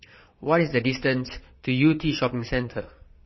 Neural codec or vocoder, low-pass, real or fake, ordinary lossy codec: vocoder, 22.05 kHz, 80 mel bands, Vocos; 7.2 kHz; fake; MP3, 24 kbps